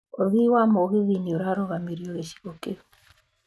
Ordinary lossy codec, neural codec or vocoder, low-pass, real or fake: none; none; none; real